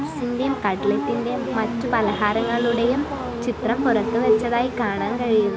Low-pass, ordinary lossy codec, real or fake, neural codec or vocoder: none; none; real; none